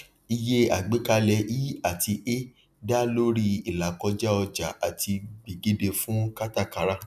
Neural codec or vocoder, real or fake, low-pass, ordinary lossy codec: none; real; 14.4 kHz; none